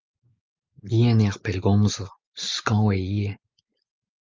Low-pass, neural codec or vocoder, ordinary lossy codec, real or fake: 7.2 kHz; none; Opus, 24 kbps; real